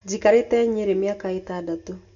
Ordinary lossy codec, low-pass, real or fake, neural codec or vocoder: MP3, 96 kbps; 7.2 kHz; real; none